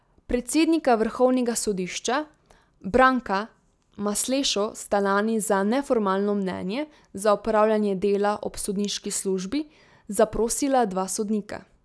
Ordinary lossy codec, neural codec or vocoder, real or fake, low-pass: none; none; real; none